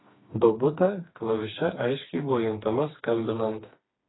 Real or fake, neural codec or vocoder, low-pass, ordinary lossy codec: fake; codec, 16 kHz, 2 kbps, FreqCodec, smaller model; 7.2 kHz; AAC, 16 kbps